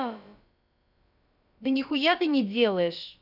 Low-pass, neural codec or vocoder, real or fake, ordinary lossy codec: 5.4 kHz; codec, 16 kHz, about 1 kbps, DyCAST, with the encoder's durations; fake; none